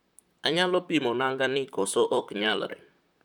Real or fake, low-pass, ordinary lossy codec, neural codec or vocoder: fake; 19.8 kHz; none; vocoder, 44.1 kHz, 128 mel bands, Pupu-Vocoder